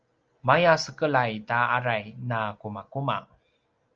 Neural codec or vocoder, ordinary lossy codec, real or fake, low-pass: none; Opus, 24 kbps; real; 7.2 kHz